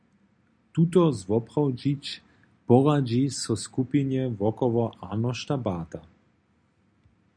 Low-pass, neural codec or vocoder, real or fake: 9.9 kHz; none; real